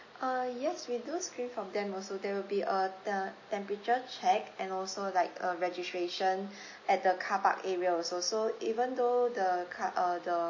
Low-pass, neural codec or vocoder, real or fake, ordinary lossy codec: 7.2 kHz; none; real; MP3, 32 kbps